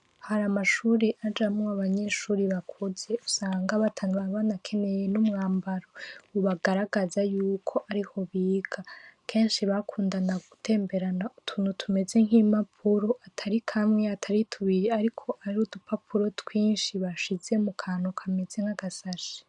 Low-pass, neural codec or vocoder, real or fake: 10.8 kHz; none; real